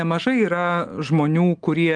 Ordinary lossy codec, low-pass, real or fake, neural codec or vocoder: Opus, 24 kbps; 9.9 kHz; real; none